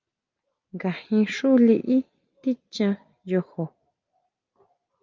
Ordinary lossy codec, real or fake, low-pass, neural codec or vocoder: Opus, 24 kbps; real; 7.2 kHz; none